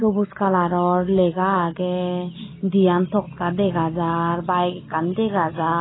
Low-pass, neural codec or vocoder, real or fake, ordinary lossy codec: 7.2 kHz; none; real; AAC, 16 kbps